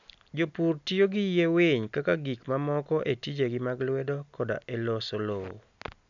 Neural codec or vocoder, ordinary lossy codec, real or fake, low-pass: none; none; real; 7.2 kHz